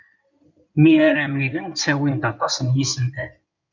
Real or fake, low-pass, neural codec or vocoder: fake; 7.2 kHz; codec, 16 kHz in and 24 kHz out, 2.2 kbps, FireRedTTS-2 codec